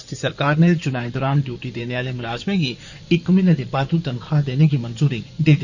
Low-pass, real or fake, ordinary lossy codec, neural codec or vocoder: 7.2 kHz; fake; none; codec, 16 kHz in and 24 kHz out, 2.2 kbps, FireRedTTS-2 codec